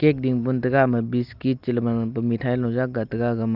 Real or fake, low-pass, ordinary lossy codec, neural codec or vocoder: real; 5.4 kHz; Opus, 24 kbps; none